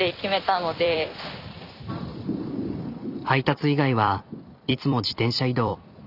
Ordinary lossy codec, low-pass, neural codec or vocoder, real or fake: none; 5.4 kHz; vocoder, 44.1 kHz, 128 mel bands every 256 samples, BigVGAN v2; fake